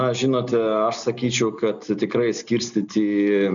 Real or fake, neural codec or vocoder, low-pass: real; none; 7.2 kHz